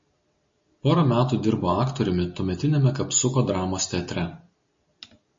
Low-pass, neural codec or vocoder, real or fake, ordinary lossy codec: 7.2 kHz; none; real; MP3, 32 kbps